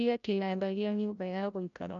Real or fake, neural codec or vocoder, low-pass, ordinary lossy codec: fake; codec, 16 kHz, 0.5 kbps, FreqCodec, larger model; 7.2 kHz; none